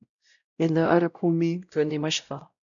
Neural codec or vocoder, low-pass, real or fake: codec, 16 kHz, 0.5 kbps, X-Codec, WavLM features, trained on Multilingual LibriSpeech; 7.2 kHz; fake